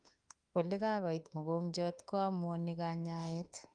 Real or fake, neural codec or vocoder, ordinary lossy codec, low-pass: fake; autoencoder, 48 kHz, 32 numbers a frame, DAC-VAE, trained on Japanese speech; Opus, 32 kbps; 9.9 kHz